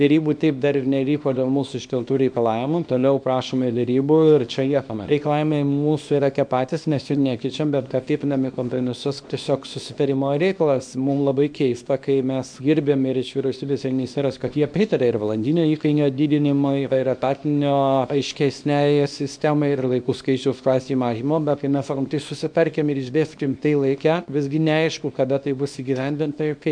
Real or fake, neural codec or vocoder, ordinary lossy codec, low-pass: fake; codec, 24 kHz, 0.9 kbps, WavTokenizer, small release; MP3, 64 kbps; 9.9 kHz